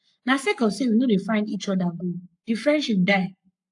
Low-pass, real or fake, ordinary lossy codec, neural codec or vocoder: 10.8 kHz; fake; AAC, 64 kbps; codec, 44.1 kHz, 7.8 kbps, Pupu-Codec